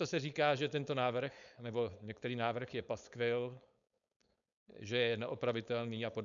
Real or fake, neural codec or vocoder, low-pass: fake; codec, 16 kHz, 4.8 kbps, FACodec; 7.2 kHz